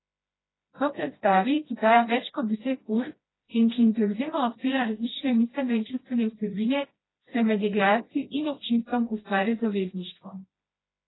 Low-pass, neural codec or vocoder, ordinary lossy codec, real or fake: 7.2 kHz; codec, 16 kHz, 1 kbps, FreqCodec, smaller model; AAC, 16 kbps; fake